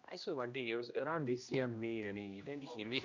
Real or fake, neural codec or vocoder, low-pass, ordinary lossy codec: fake; codec, 16 kHz, 1 kbps, X-Codec, HuBERT features, trained on general audio; 7.2 kHz; MP3, 64 kbps